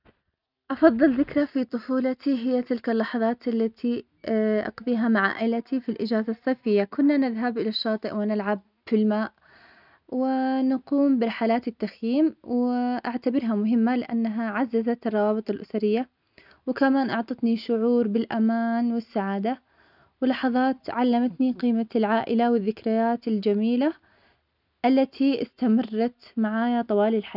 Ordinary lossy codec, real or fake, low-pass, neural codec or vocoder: none; real; 5.4 kHz; none